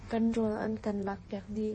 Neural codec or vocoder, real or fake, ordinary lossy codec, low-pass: codec, 16 kHz in and 24 kHz out, 1.1 kbps, FireRedTTS-2 codec; fake; MP3, 32 kbps; 9.9 kHz